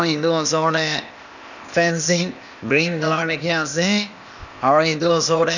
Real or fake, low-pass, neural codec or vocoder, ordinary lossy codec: fake; 7.2 kHz; codec, 16 kHz, 0.8 kbps, ZipCodec; none